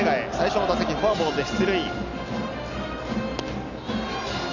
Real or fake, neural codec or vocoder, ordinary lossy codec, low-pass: real; none; none; 7.2 kHz